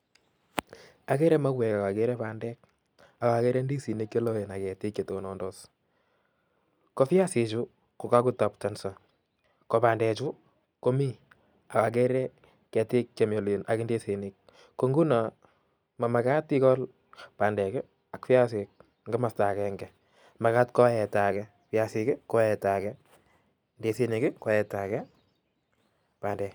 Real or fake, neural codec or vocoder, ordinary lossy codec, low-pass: real; none; none; none